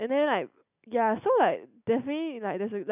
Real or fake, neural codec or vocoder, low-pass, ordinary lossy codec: real; none; 3.6 kHz; none